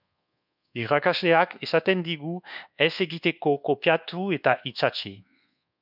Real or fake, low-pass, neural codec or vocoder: fake; 5.4 kHz; codec, 24 kHz, 1.2 kbps, DualCodec